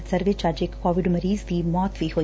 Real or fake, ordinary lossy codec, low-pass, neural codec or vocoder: real; none; none; none